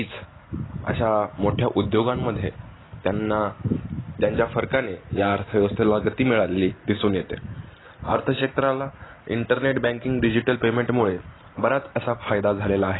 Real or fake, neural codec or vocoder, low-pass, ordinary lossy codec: real; none; 7.2 kHz; AAC, 16 kbps